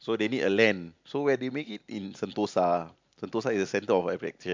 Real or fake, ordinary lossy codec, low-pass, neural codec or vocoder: real; MP3, 64 kbps; 7.2 kHz; none